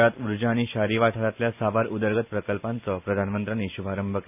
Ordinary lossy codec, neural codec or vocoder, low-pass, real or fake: none; none; 3.6 kHz; real